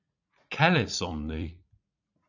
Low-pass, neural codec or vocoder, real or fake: 7.2 kHz; vocoder, 44.1 kHz, 80 mel bands, Vocos; fake